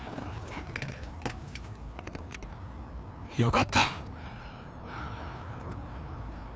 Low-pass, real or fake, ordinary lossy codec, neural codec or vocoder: none; fake; none; codec, 16 kHz, 2 kbps, FreqCodec, larger model